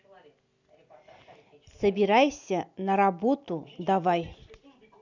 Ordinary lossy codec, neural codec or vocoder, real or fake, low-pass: none; none; real; 7.2 kHz